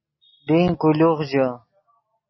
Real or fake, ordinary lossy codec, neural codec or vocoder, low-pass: real; MP3, 24 kbps; none; 7.2 kHz